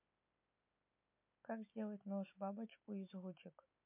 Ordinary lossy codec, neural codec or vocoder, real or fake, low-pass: MP3, 24 kbps; none; real; 3.6 kHz